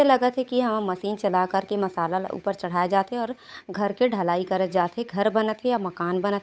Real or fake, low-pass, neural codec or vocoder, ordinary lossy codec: fake; none; codec, 16 kHz, 8 kbps, FunCodec, trained on Chinese and English, 25 frames a second; none